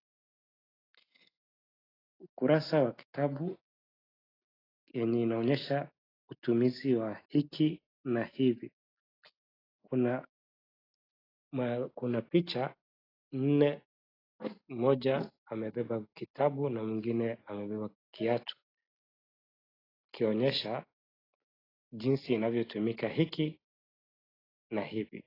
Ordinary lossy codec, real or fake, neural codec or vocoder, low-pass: AAC, 24 kbps; real; none; 5.4 kHz